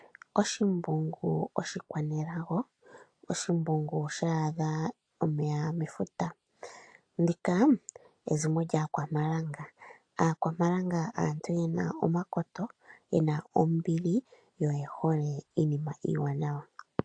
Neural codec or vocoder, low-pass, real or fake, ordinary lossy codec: none; 9.9 kHz; real; AAC, 48 kbps